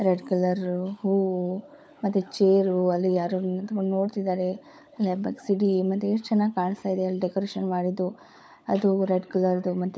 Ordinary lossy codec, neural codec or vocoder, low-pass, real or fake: none; codec, 16 kHz, 16 kbps, FunCodec, trained on LibriTTS, 50 frames a second; none; fake